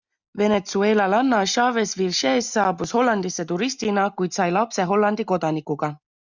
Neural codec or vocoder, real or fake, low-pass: none; real; 7.2 kHz